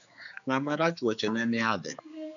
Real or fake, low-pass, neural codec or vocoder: fake; 7.2 kHz; codec, 16 kHz, 4 kbps, X-Codec, HuBERT features, trained on general audio